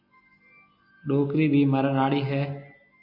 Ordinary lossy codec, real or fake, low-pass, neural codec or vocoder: AAC, 48 kbps; real; 5.4 kHz; none